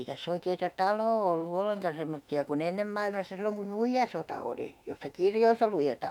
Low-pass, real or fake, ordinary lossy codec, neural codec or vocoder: 19.8 kHz; fake; none; autoencoder, 48 kHz, 32 numbers a frame, DAC-VAE, trained on Japanese speech